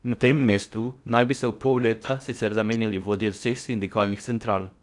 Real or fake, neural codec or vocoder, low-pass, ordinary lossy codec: fake; codec, 16 kHz in and 24 kHz out, 0.6 kbps, FocalCodec, streaming, 4096 codes; 10.8 kHz; none